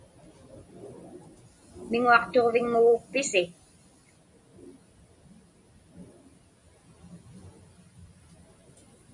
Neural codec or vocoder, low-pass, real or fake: none; 10.8 kHz; real